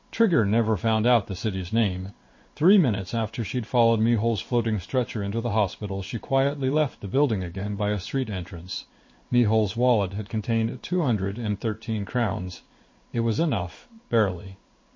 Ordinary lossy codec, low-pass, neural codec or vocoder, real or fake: MP3, 32 kbps; 7.2 kHz; codec, 16 kHz in and 24 kHz out, 1 kbps, XY-Tokenizer; fake